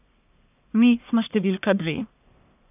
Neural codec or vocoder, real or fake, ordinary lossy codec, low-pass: codec, 44.1 kHz, 1.7 kbps, Pupu-Codec; fake; none; 3.6 kHz